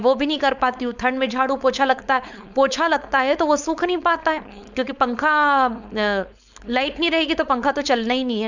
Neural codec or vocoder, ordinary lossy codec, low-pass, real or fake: codec, 16 kHz, 4.8 kbps, FACodec; none; 7.2 kHz; fake